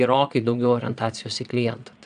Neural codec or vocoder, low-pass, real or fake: vocoder, 22.05 kHz, 80 mel bands, Vocos; 9.9 kHz; fake